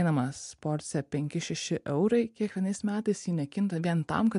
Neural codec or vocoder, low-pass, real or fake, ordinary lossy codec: none; 10.8 kHz; real; MP3, 64 kbps